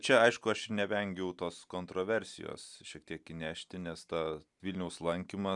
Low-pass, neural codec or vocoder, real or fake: 10.8 kHz; none; real